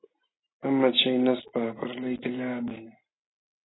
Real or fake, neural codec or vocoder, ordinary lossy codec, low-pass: real; none; AAC, 16 kbps; 7.2 kHz